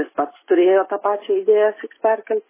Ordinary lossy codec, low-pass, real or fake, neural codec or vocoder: MP3, 16 kbps; 3.6 kHz; real; none